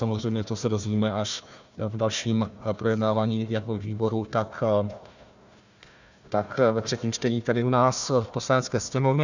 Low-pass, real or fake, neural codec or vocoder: 7.2 kHz; fake; codec, 16 kHz, 1 kbps, FunCodec, trained on Chinese and English, 50 frames a second